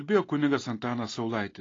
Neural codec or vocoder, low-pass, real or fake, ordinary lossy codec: none; 7.2 kHz; real; AAC, 32 kbps